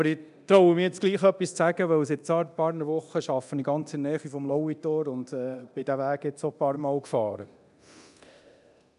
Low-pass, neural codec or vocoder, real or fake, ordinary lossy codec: 10.8 kHz; codec, 24 kHz, 0.9 kbps, DualCodec; fake; none